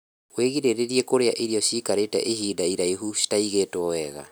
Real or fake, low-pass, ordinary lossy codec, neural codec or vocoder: real; none; none; none